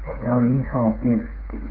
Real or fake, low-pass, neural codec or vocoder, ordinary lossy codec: fake; 5.4 kHz; codec, 16 kHz, 8 kbps, FreqCodec, smaller model; Opus, 24 kbps